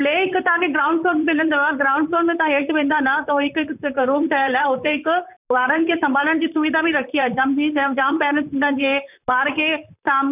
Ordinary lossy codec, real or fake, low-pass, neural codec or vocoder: none; fake; 3.6 kHz; vocoder, 44.1 kHz, 128 mel bands, Pupu-Vocoder